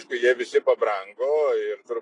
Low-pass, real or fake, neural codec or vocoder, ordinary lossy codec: 10.8 kHz; real; none; AAC, 32 kbps